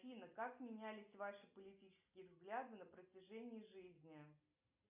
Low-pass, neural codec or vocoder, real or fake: 3.6 kHz; none; real